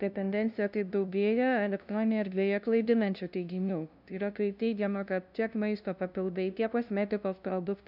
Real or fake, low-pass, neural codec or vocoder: fake; 5.4 kHz; codec, 16 kHz, 0.5 kbps, FunCodec, trained on LibriTTS, 25 frames a second